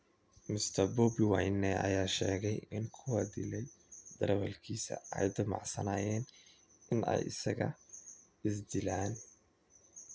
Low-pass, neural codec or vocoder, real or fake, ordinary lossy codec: none; none; real; none